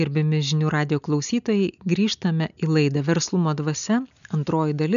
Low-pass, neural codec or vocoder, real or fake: 7.2 kHz; none; real